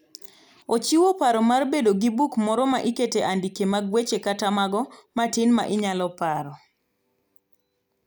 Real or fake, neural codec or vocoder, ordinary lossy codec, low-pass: real; none; none; none